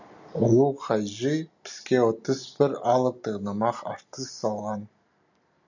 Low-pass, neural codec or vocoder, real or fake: 7.2 kHz; none; real